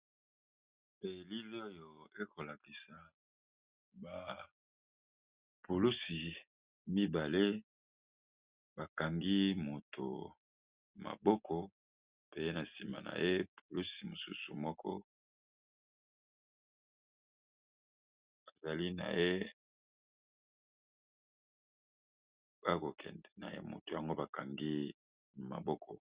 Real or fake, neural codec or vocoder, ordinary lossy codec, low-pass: real; none; Opus, 64 kbps; 3.6 kHz